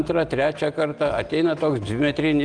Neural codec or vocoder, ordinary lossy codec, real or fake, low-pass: none; Opus, 32 kbps; real; 9.9 kHz